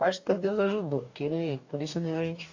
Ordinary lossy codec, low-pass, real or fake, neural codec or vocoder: AAC, 48 kbps; 7.2 kHz; fake; codec, 44.1 kHz, 2.6 kbps, DAC